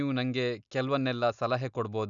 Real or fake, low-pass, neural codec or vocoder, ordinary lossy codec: real; 7.2 kHz; none; none